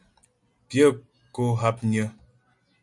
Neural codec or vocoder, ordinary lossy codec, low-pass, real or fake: none; MP3, 64 kbps; 10.8 kHz; real